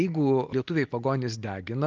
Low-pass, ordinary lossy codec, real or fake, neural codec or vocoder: 7.2 kHz; Opus, 32 kbps; real; none